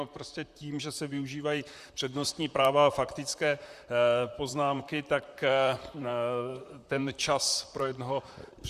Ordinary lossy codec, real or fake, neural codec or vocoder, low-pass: Opus, 64 kbps; fake; vocoder, 44.1 kHz, 128 mel bands, Pupu-Vocoder; 14.4 kHz